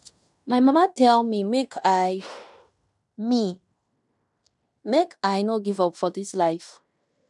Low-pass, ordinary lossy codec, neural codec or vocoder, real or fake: 10.8 kHz; none; codec, 16 kHz in and 24 kHz out, 0.9 kbps, LongCat-Audio-Codec, fine tuned four codebook decoder; fake